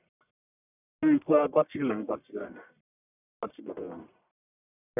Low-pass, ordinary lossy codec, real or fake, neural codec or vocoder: 3.6 kHz; none; fake; codec, 44.1 kHz, 1.7 kbps, Pupu-Codec